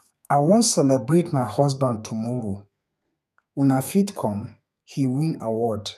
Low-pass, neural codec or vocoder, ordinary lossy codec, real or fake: 14.4 kHz; codec, 32 kHz, 1.9 kbps, SNAC; none; fake